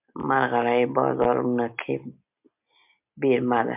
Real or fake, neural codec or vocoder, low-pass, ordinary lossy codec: real; none; 3.6 kHz; MP3, 32 kbps